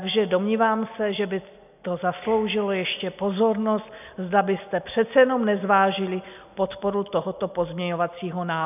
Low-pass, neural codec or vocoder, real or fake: 3.6 kHz; none; real